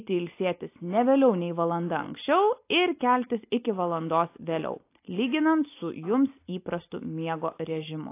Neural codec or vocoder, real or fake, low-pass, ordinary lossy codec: none; real; 3.6 kHz; AAC, 24 kbps